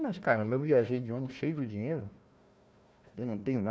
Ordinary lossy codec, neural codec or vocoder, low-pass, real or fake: none; codec, 16 kHz, 1 kbps, FunCodec, trained on Chinese and English, 50 frames a second; none; fake